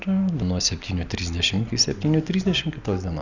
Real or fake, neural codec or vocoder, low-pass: real; none; 7.2 kHz